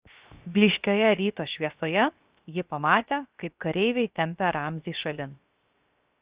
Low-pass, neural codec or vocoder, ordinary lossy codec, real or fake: 3.6 kHz; codec, 16 kHz, 0.7 kbps, FocalCodec; Opus, 64 kbps; fake